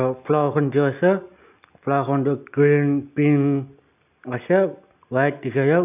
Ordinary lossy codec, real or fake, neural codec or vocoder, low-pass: none; real; none; 3.6 kHz